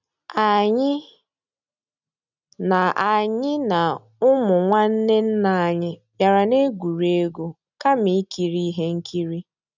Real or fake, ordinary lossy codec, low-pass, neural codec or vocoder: real; none; 7.2 kHz; none